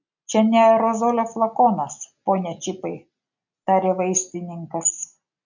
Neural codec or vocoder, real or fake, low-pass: none; real; 7.2 kHz